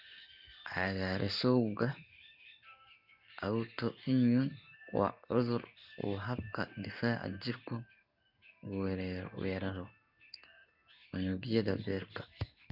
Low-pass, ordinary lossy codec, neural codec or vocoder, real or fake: 5.4 kHz; none; codec, 16 kHz in and 24 kHz out, 1 kbps, XY-Tokenizer; fake